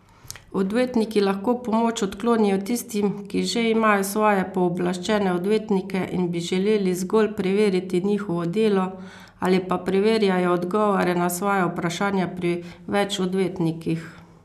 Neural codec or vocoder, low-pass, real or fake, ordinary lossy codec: none; 14.4 kHz; real; none